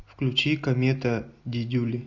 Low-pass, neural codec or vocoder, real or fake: 7.2 kHz; none; real